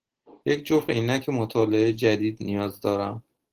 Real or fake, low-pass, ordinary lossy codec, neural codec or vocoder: real; 14.4 kHz; Opus, 16 kbps; none